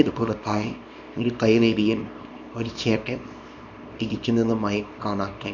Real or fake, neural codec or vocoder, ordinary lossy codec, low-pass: fake; codec, 24 kHz, 0.9 kbps, WavTokenizer, small release; none; 7.2 kHz